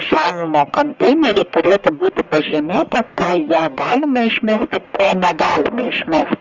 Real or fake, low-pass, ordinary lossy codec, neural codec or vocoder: fake; 7.2 kHz; Opus, 64 kbps; codec, 44.1 kHz, 1.7 kbps, Pupu-Codec